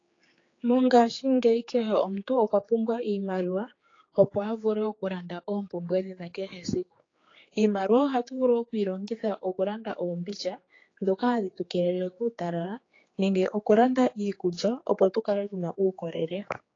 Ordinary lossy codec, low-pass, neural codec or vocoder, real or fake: AAC, 32 kbps; 7.2 kHz; codec, 16 kHz, 4 kbps, X-Codec, HuBERT features, trained on general audio; fake